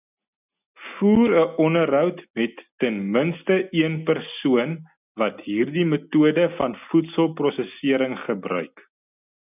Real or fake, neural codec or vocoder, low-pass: real; none; 3.6 kHz